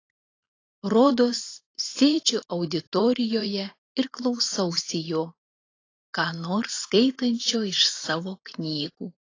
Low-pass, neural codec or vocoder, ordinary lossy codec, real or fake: 7.2 kHz; vocoder, 44.1 kHz, 128 mel bands every 512 samples, BigVGAN v2; AAC, 32 kbps; fake